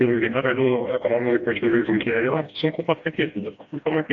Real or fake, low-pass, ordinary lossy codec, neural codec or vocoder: fake; 7.2 kHz; AAC, 48 kbps; codec, 16 kHz, 1 kbps, FreqCodec, smaller model